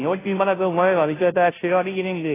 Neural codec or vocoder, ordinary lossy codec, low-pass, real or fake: codec, 16 kHz, 0.5 kbps, FunCodec, trained on Chinese and English, 25 frames a second; AAC, 16 kbps; 3.6 kHz; fake